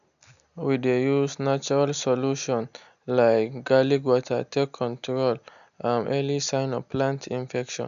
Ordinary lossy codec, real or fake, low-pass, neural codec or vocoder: none; real; 7.2 kHz; none